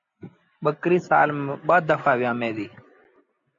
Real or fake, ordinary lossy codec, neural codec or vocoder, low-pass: real; MP3, 64 kbps; none; 7.2 kHz